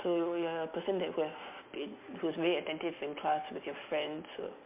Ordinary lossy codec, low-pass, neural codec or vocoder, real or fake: MP3, 32 kbps; 3.6 kHz; none; real